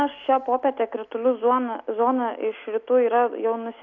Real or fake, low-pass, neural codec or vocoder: real; 7.2 kHz; none